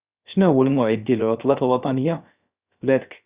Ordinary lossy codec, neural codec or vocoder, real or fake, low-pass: Opus, 64 kbps; codec, 16 kHz, 0.3 kbps, FocalCodec; fake; 3.6 kHz